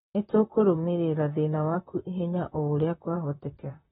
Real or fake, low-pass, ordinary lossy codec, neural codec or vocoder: fake; 19.8 kHz; AAC, 16 kbps; vocoder, 44.1 kHz, 128 mel bands, Pupu-Vocoder